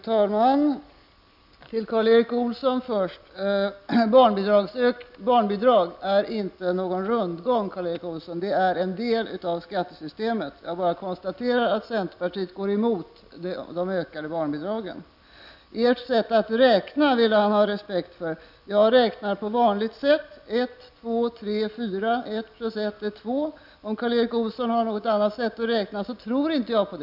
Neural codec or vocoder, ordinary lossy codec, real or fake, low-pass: none; none; real; 5.4 kHz